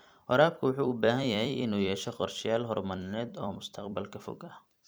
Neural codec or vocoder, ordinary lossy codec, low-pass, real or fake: vocoder, 44.1 kHz, 128 mel bands every 256 samples, BigVGAN v2; none; none; fake